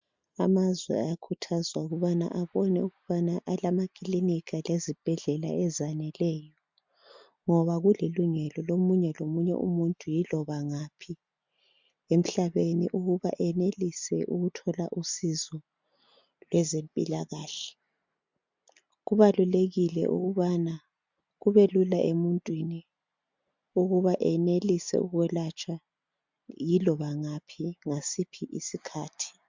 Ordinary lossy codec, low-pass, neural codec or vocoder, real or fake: MP3, 64 kbps; 7.2 kHz; none; real